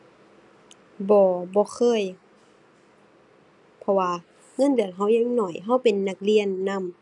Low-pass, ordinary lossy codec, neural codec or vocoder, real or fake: 10.8 kHz; none; none; real